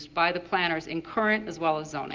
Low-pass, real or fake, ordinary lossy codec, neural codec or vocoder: 7.2 kHz; real; Opus, 32 kbps; none